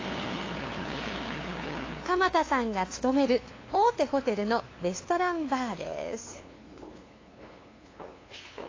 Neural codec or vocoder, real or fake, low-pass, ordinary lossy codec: codec, 16 kHz, 2 kbps, FunCodec, trained on LibriTTS, 25 frames a second; fake; 7.2 kHz; AAC, 32 kbps